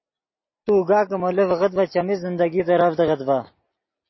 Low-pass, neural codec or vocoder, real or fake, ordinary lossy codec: 7.2 kHz; none; real; MP3, 24 kbps